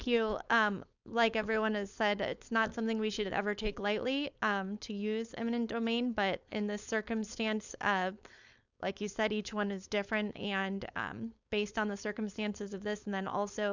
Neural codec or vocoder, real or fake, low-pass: codec, 16 kHz, 4.8 kbps, FACodec; fake; 7.2 kHz